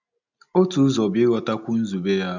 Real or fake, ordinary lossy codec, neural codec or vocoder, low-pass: real; none; none; 7.2 kHz